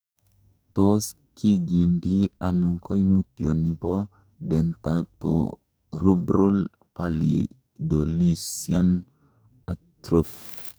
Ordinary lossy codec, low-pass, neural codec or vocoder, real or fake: none; none; codec, 44.1 kHz, 2.6 kbps, DAC; fake